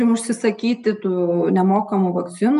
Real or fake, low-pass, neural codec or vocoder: real; 10.8 kHz; none